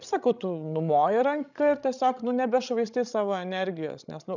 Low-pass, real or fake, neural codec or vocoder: 7.2 kHz; fake; codec, 16 kHz, 16 kbps, FreqCodec, larger model